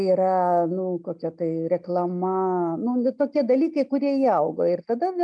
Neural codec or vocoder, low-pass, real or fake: none; 9.9 kHz; real